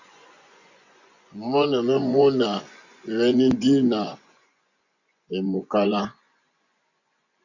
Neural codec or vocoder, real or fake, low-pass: vocoder, 24 kHz, 100 mel bands, Vocos; fake; 7.2 kHz